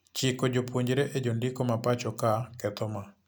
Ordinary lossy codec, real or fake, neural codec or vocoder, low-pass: none; real; none; none